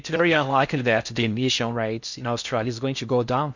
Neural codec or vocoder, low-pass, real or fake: codec, 16 kHz in and 24 kHz out, 0.6 kbps, FocalCodec, streaming, 4096 codes; 7.2 kHz; fake